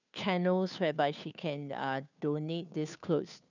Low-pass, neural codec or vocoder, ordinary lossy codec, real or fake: 7.2 kHz; codec, 16 kHz, 2 kbps, FunCodec, trained on Chinese and English, 25 frames a second; none; fake